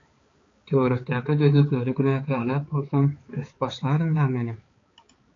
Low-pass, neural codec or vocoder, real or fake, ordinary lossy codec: 7.2 kHz; codec, 16 kHz, 4 kbps, X-Codec, HuBERT features, trained on balanced general audio; fake; AAC, 32 kbps